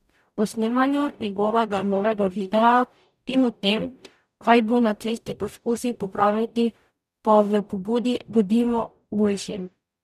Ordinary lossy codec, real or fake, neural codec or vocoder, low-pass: none; fake; codec, 44.1 kHz, 0.9 kbps, DAC; 14.4 kHz